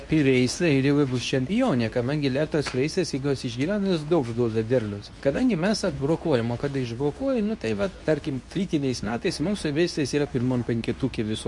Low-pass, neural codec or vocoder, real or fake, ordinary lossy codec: 10.8 kHz; codec, 24 kHz, 0.9 kbps, WavTokenizer, medium speech release version 2; fake; MP3, 64 kbps